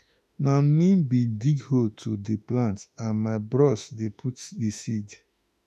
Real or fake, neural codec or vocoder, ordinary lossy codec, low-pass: fake; autoencoder, 48 kHz, 32 numbers a frame, DAC-VAE, trained on Japanese speech; none; 14.4 kHz